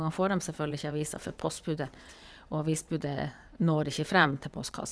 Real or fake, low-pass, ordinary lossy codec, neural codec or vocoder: fake; none; none; vocoder, 22.05 kHz, 80 mel bands, WaveNeXt